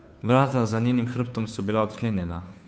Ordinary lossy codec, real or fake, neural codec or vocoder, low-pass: none; fake; codec, 16 kHz, 2 kbps, FunCodec, trained on Chinese and English, 25 frames a second; none